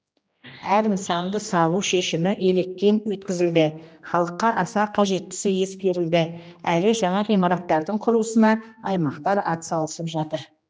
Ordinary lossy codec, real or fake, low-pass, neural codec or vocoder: none; fake; none; codec, 16 kHz, 1 kbps, X-Codec, HuBERT features, trained on general audio